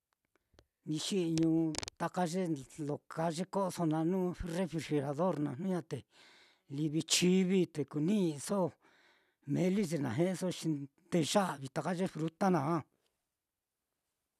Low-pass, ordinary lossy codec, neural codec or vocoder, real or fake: 14.4 kHz; none; none; real